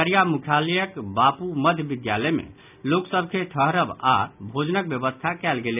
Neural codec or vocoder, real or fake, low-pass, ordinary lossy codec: none; real; 3.6 kHz; none